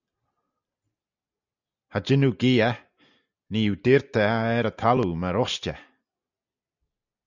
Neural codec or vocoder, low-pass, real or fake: none; 7.2 kHz; real